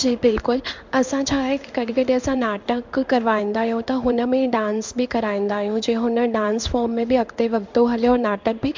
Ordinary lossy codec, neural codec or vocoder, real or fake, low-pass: MP3, 64 kbps; codec, 16 kHz in and 24 kHz out, 1 kbps, XY-Tokenizer; fake; 7.2 kHz